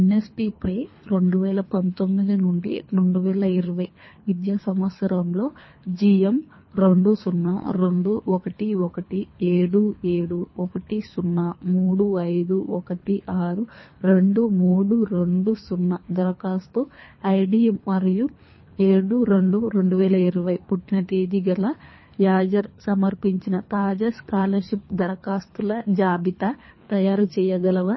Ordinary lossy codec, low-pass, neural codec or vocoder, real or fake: MP3, 24 kbps; 7.2 kHz; codec, 24 kHz, 3 kbps, HILCodec; fake